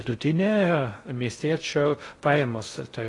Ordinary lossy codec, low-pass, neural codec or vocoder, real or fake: AAC, 32 kbps; 10.8 kHz; codec, 16 kHz in and 24 kHz out, 0.6 kbps, FocalCodec, streaming, 2048 codes; fake